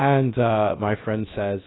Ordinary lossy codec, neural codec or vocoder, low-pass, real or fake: AAC, 16 kbps; codec, 16 kHz, about 1 kbps, DyCAST, with the encoder's durations; 7.2 kHz; fake